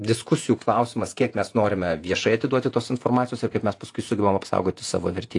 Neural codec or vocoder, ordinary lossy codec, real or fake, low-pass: vocoder, 48 kHz, 128 mel bands, Vocos; AAC, 48 kbps; fake; 10.8 kHz